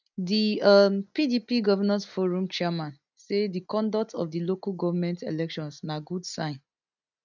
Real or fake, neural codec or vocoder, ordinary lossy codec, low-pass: real; none; none; 7.2 kHz